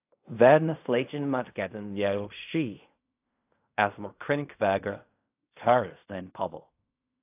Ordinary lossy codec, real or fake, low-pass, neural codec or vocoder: AAC, 32 kbps; fake; 3.6 kHz; codec, 16 kHz in and 24 kHz out, 0.4 kbps, LongCat-Audio-Codec, fine tuned four codebook decoder